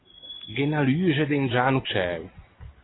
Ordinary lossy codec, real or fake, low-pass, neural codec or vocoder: AAC, 16 kbps; real; 7.2 kHz; none